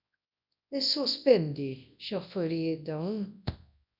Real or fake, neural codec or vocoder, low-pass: fake; codec, 24 kHz, 0.9 kbps, WavTokenizer, large speech release; 5.4 kHz